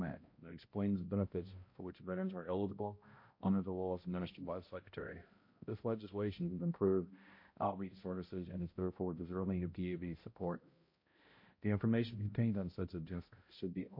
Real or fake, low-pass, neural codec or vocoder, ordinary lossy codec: fake; 5.4 kHz; codec, 16 kHz, 0.5 kbps, X-Codec, HuBERT features, trained on balanced general audio; MP3, 32 kbps